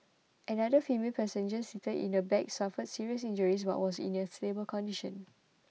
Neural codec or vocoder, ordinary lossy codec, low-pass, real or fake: none; none; none; real